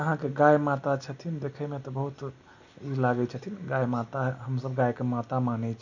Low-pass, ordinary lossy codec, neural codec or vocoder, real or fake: 7.2 kHz; none; none; real